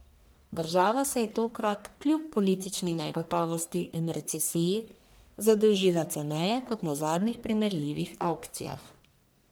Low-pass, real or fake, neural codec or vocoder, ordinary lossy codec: none; fake; codec, 44.1 kHz, 1.7 kbps, Pupu-Codec; none